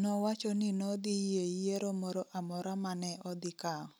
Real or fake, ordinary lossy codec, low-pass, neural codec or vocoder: real; none; none; none